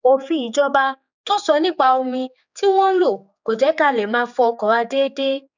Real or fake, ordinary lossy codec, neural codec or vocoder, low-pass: fake; none; codec, 16 kHz, 4 kbps, X-Codec, HuBERT features, trained on general audio; 7.2 kHz